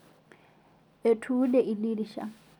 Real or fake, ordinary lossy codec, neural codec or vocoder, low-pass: real; none; none; 19.8 kHz